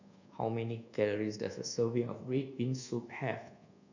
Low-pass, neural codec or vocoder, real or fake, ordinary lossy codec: 7.2 kHz; codec, 24 kHz, 1.2 kbps, DualCodec; fake; none